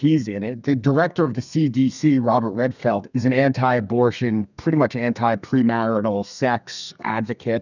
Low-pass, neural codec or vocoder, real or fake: 7.2 kHz; codec, 32 kHz, 1.9 kbps, SNAC; fake